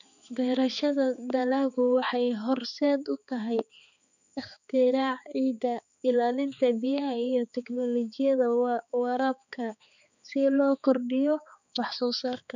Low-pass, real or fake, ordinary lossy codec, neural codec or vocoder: 7.2 kHz; fake; none; codec, 16 kHz, 4 kbps, X-Codec, HuBERT features, trained on balanced general audio